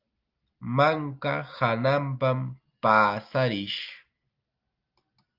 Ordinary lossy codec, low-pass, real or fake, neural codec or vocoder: Opus, 32 kbps; 5.4 kHz; real; none